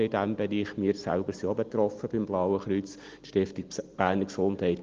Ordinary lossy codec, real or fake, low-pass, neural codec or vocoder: Opus, 24 kbps; real; 7.2 kHz; none